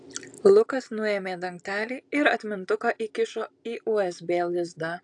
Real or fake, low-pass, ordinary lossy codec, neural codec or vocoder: real; 10.8 kHz; AAC, 64 kbps; none